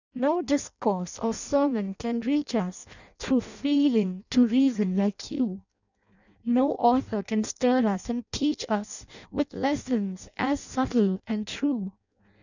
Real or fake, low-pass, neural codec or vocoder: fake; 7.2 kHz; codec, 16 kHz in and 24 kHz out, 0.6 kbps, FireRedTTS-2 codec